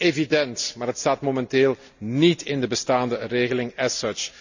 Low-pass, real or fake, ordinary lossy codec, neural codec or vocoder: 7.2 kHz; real; none; none